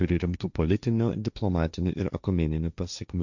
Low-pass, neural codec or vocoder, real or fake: 7.2 kHz; codec, 16 kHz, 1.1 kbps, Voila-Tokenizer; fake